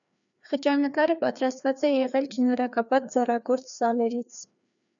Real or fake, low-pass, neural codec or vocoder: fake; 7.2 kHz; codec, 16 kHz, 2 kbps, FreqCodec, larger model